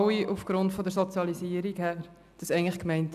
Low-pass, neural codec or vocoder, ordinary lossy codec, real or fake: 14.4 kHz; none; none; real